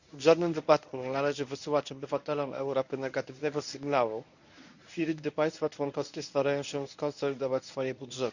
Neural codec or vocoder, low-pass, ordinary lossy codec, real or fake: codec, 24 kHz, 0.9 kbps, WavTokenizer, medium speech release version 1; 7.2 kHz; none; fake